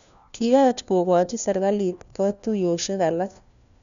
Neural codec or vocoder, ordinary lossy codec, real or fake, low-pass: codec, 16 kHz, 1 kbps, FunCodec, trained on LibriTTS, 50 frames a second; none; fake; 7.2 kHz